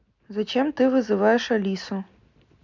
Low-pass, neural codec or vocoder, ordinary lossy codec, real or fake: 7.2 kHz; none; MP3, 64 kbps; real